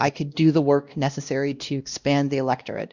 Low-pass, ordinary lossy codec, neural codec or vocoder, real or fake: 7.2 kHz; Opus, 64 kbps; codec, 16 kHz, 1 kbps, X-Codec, WavLM features, trained on Multilingual LibriSpeech; fake